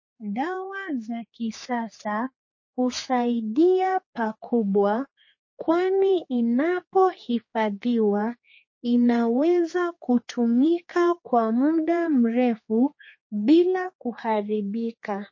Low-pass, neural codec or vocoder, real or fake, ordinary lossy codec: 7.2 kHz; codec, 16 kHz, 4 kbps, X-Codec, HuBERT features, trained on general audio; fake; MP3, 32 kbps